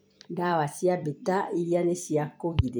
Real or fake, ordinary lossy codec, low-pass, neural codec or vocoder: fake; none; none; vocoder, 44.1 kHz, 128 mel bands every 256 samples, BigVGAN v2